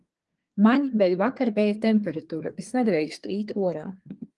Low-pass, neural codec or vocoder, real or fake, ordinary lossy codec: 10.8 kHz; codec, 24 kHz, 1 kbps, SNAC; fake; Opus, 24 kbps